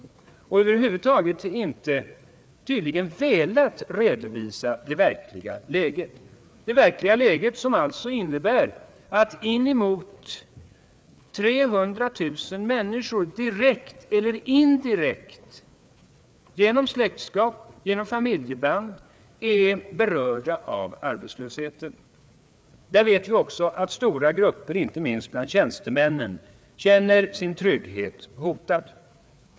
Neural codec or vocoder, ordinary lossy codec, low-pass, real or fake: codec, 16 kHz, 4 kbps, FreqCodec, larger model; none; none; fake